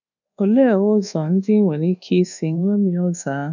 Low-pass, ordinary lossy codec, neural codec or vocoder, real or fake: 7.2 kHz; none; codec, 24 kHz, 1.2 kbps, DualCodec; fake